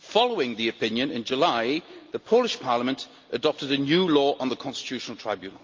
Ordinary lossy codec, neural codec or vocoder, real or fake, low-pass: Opus, 24 kbps; none; real; 7.2 kHz